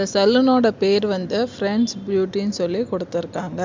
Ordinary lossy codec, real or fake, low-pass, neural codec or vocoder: MP3, 64 kbps; real; 7.2 kHz; none